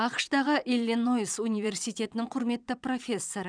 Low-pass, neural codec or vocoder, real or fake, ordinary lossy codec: 9.9 kHz; vocoder, 22.05 kHz, 80 mel bands, WaveNeXt; fake; none